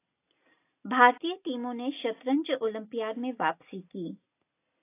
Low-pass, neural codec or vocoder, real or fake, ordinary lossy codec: 3.6 kHz; none; real; AAC, 24 kbps